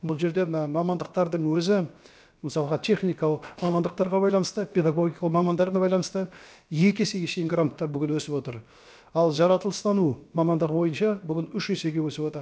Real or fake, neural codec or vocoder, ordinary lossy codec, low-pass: fake; codec, 16 kHz, about 1 kbps, DyCAST, with the encoder's durations; none; none